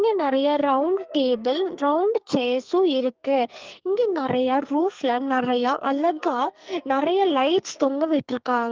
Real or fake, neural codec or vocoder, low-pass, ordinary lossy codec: fake; codec, 44.1 kHz, 2.6 kbps, SNAC; 7.2 kHz; Opus, 24 kbps